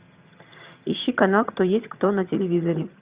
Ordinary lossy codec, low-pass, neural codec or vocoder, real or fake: Opus, 64 kbps; 3.6 kHz; vocoder, 22.05 kHz, 80 mel bands, HiFi-GAN; fake